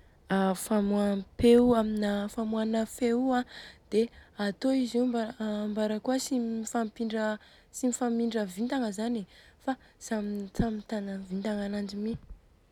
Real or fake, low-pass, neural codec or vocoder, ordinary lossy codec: real; 19.8 kHz; none; none